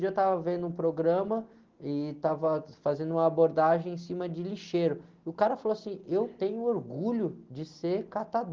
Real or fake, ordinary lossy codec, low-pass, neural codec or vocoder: real; Opus, 16 kbps; 7.2 kHz; none